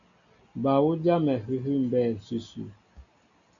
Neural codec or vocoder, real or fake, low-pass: none; real; 7.2 kHz